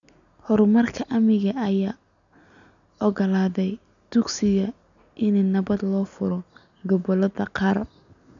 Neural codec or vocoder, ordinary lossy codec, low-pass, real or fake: none; none; 7.2 kHz; real